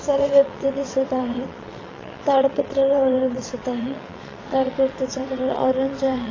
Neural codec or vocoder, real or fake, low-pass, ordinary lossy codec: vocoder, 22.05 kHz, 80 mel bands, WaveNeXt; fake; 7.2 kHz; AAC, 32 kbps